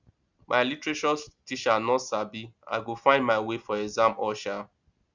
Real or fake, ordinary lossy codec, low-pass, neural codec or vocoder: real; Opus, 64 kbps; 7.2 kHz; none